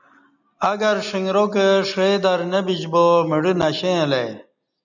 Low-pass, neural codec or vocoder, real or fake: 7.2 kHz; none; real